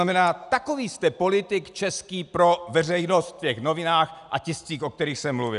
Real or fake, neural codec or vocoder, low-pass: real; none; 10.8 kHz